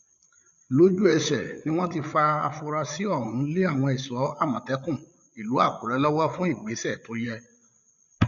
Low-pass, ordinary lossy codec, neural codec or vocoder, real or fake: 7.2 kHz; none; codec, 16 kHz, 8 kbps, FreqCodec, larger model; fake